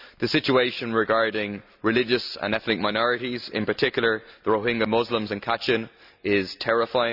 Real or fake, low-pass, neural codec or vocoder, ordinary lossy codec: real; 5.4 kHz; none; none